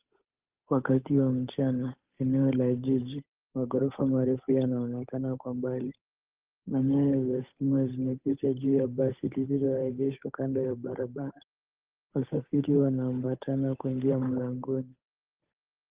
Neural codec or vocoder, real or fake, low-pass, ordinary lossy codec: codec, 16 kHz, 8 kbps, FunCodec, trained on Chinese and English, 25 frames a second; fake; 3.6 kHz; Opus, 24 kbps